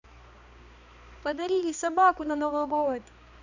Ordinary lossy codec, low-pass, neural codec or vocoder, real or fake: none; 7.2 kHz; codec, 16 kHz, 2 kbps, X-Codec, HuBERT features, trained on balanced general audio; fake